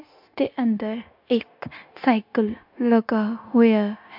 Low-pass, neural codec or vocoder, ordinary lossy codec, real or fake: 5.4 kHz; codec, 16 kHz, 0.9 kbps, LongCat-Audio-Codec; MP3, 48 kbps; fake